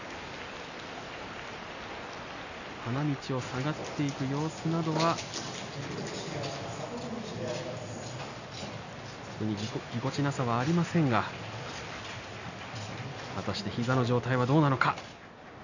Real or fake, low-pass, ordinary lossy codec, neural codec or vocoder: real; 7.2 kHz; none; none